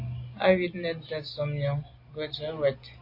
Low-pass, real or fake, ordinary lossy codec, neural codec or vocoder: 5.4 kHz; real; AAC, 32 kbps; none